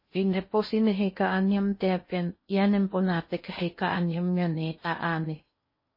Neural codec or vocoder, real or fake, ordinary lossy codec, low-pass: codec, 16 kHz in and 24 kHz out, 0.6 kbps, FocalCodec, streaming, 2048 codes; fake; MP3, 24 kbps; 5.4 kHz